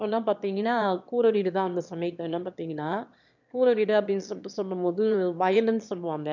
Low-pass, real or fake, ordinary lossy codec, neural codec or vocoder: 7.2 kHz; fake; none; autoencoder, 22.05 kHz, a latent of 192 numbers a frame, VITS, trained on one speaker